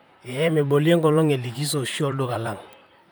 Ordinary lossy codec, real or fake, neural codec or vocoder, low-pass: none; fake; vocoder, 44.1 kHz, 128 mel bands, Pupu-Vocoder; none